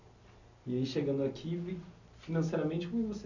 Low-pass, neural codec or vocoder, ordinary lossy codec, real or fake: 7.2 kHz; none; none; real